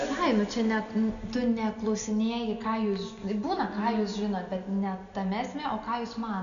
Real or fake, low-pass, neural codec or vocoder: real; 7.2 kHz; none